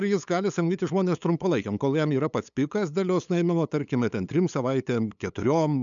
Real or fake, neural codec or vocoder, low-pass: fake; codec, 16 kHz, 8 kbps, FunCodec, trained on LibriTTS, 25 frames a second; 7.2 kHz